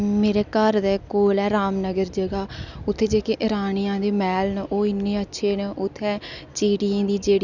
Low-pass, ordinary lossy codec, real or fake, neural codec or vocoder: 7.2 kHz; none; real; none